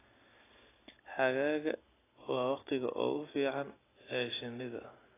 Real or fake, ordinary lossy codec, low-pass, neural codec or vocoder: real; AAC, 16 kbps; 3.6 kHz; none